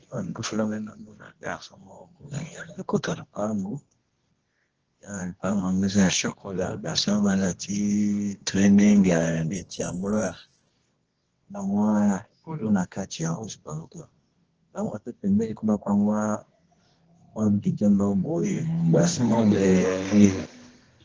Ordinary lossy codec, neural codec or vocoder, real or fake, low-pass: Opus, 16 kbps; codec, 24 kHz, 0.9 kbps, WavTokenizer, medium music audio release; fake; 7.2 kHz